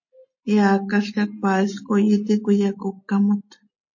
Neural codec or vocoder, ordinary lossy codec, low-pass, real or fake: none; MP3, 32 kbps; 7.2 kHz; real